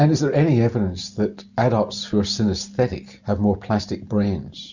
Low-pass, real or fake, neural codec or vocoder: 7.2 kHz; real; none